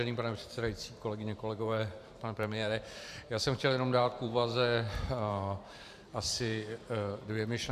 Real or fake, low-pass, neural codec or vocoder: fake; 14.4 kHz; vocoder, 44.1 kHz, 128 mel bands every 512 samples, BigVGAN v2